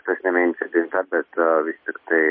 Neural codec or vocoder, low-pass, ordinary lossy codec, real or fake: none; 7.2 kHz; MP3, 24 kbps; real